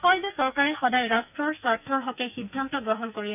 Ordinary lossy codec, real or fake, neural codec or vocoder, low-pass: none; fake; codec, 44.1 kHz, 2.6 kbps, SNAC; 3.6 kHz